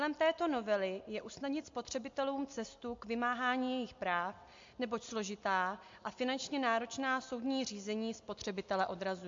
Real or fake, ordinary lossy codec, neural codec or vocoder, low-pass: real; MP3, 48 kbps; none; 7.2 kHz